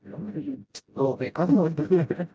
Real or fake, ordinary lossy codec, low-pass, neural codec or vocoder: fake; none; none; codec, 16 kHz, 0.5 kbps, FreqCodec, smaller model